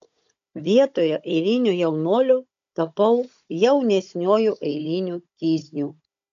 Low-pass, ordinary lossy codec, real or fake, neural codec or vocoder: 7.2 kHz; MP3, 64 kbps; fake; codec, 16 kHz, 16 kbps, FunCodec, trained on Chinese and English, 50 frames a second